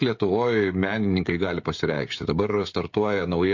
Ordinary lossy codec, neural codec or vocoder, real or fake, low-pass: MP3, 48 kbps; codec, 16 kHz, 16 kbps, FreqCodec, smaller model; fake; 7.2 kHz